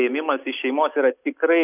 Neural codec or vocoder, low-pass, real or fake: none; 3.6 kHz; real